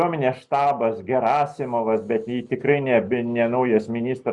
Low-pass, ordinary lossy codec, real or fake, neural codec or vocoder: 10.8 kHz; Opus, 64 kbps; real; none